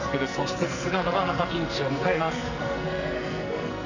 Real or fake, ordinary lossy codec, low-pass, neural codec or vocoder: fake; none; 7.2 kHz; codec, 44.1 kHz, 2.6 kbps, SNAC